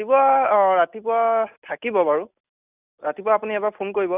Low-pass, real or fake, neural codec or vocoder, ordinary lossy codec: 3.6 kHz; real; none; none